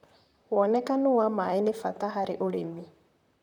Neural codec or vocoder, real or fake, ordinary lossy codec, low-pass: vocoder, 44.1 kHz, 128 mel bands, Pupu-Vocoder; fake; none; 19.8 kHz